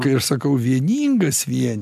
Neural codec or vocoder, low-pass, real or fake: none; 14.4 kHz; real